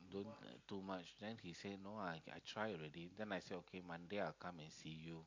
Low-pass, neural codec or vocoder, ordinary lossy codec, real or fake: 7.2 kHz; none; MP3, 48 kbps; real